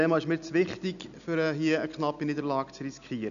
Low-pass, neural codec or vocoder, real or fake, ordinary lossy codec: 7.2 kHz; none; real; none